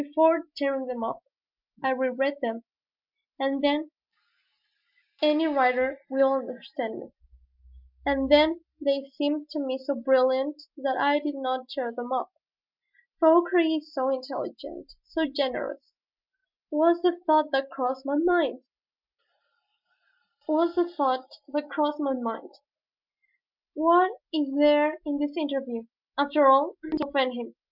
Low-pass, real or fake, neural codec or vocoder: 5.4 kHz; real; none